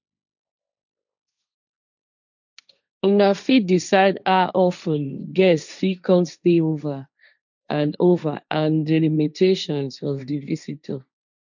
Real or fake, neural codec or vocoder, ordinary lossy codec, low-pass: fake; codec, 16 kHz, 1.1 kbps, Voila-Tokenizer; none; 7.2 kHz